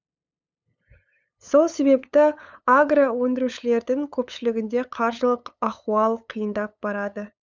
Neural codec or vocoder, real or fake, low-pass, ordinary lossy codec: codec, 16 kHz, 8 kbps, FunCodec, trained on LibriTTS, 25 frames a second; fake; none; none